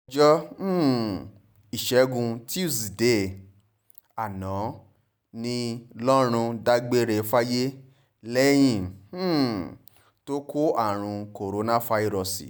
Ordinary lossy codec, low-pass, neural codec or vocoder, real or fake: none; none; none; real